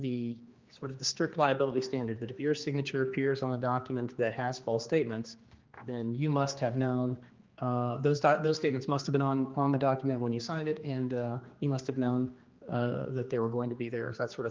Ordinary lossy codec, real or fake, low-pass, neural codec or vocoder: Opus, 32 kbps; fake; 7.2 kHz; codec, 16 kHz, 2 kbps, X-Codec, HuBERT features, trained on general audio